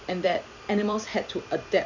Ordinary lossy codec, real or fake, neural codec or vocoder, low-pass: none; fake; vocoder, 44.1 kHz, 128 mel bands every 256 samples, BigVGAN v2; 7.2 kHz